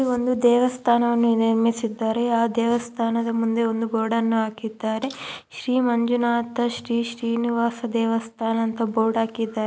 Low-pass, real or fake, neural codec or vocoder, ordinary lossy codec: none; real; none; none